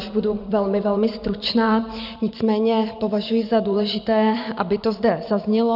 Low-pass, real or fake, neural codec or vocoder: 5.4 kHz; fake; vocoder, 44.1 kHz, 128 mel bands every 512 samples, BigVGAN v2